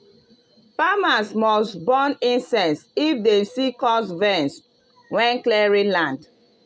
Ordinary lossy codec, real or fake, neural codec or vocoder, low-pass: none; real; none; none